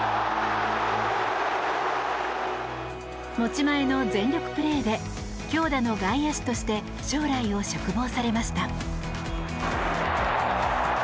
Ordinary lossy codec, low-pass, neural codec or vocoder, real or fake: none; none; none; real